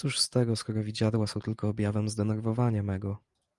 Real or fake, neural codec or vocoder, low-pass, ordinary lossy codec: fake; vocoder, 44.1 kHz, 128 mel bands every 512 samples, BigVGAN v2; 10.8 kHz; Opus, 24 kbps